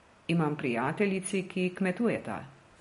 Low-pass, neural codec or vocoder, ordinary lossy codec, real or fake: 10.8 kHz; none; MP3, 48 kbps; real